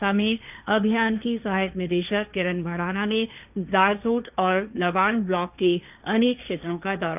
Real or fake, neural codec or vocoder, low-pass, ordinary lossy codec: fake; codec, 16 kHz, 1.1 kbps, Voila-Tokenizer; 3.6 kHz; none